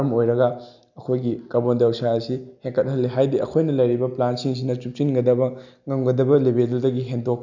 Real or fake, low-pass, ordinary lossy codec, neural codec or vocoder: real; 7.2 kHz; none; none